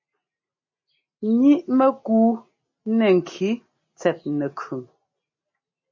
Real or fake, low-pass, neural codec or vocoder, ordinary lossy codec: real; 7.2 kHz; none; MP3, 32 kbps